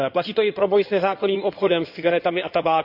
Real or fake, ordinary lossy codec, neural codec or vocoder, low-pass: fake; none; codec, 16 kHz in and 24 kHz out, 2.2 kbps, FireRedTTS-2 codec; 5.4 kHz